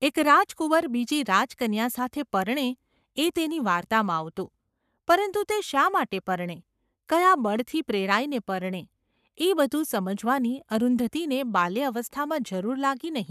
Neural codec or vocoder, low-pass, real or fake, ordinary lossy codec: codec, 44.1 kHz, 7.8 kbps, Pupu-Codec; 14.4 kHz; fake; none